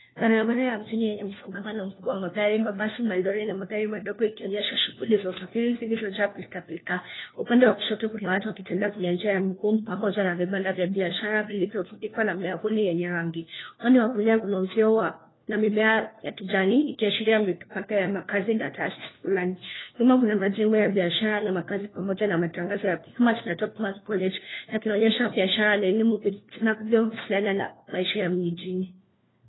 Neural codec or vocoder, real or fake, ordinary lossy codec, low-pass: codec, 16 kHz, 1 kbps, FunCodec, trained on LibriTTS, 50 frames a second; fake; AAC, 16 kbps; 7.2 kHz